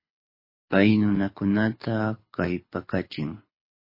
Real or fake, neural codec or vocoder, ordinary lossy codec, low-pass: fake; codec, 24 kHz, 6 kbps, HILCodec; MP3, 24 kbps; 5.4 kHz